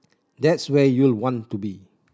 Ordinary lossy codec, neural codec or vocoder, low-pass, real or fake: none; none; none; real